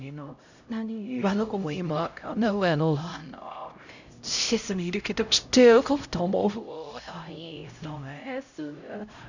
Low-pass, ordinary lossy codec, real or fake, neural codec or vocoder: 7.2 kHz; none; fake; codec, 16 kHz, 0.5 kbps, X-Codec, HuBERT features, trained on LibriSpeech